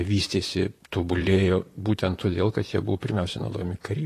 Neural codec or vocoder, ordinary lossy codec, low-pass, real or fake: vocoder, 44.1 kHz, 128 mel bands, Pupu-Vocoder; AAC, 48 kbps; 14.4 kHz; fake